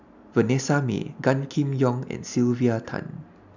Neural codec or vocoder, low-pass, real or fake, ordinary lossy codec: none; 7.2 kHz; real; none